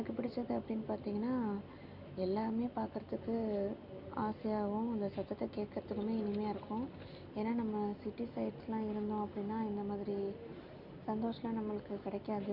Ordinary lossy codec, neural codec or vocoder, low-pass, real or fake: none; none; 5.4 kHz; real